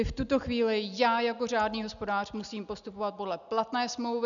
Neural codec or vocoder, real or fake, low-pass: none; real; 7.2 kHz